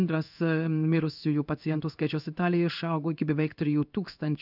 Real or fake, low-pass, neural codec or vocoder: fake; 5.4 kHz; codec, 16 kHz in and 24 kHz out, 1 kbps, XY-Tokenizer